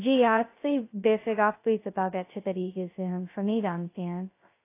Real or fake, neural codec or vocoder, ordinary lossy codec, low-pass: fake; codec, 16 kHz, 0.2 kbps, FocalCodec; AAC, 24 kbps; 3.6 kHz